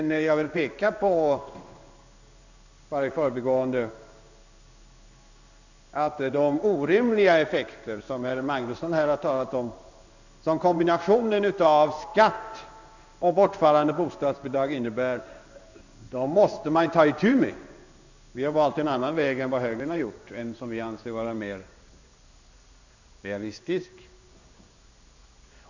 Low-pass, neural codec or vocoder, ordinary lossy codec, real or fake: 7.2 kHz; codec, 16 kHz in and 24 kHz out, 1 kbps, XY-Tokenizer; none; fake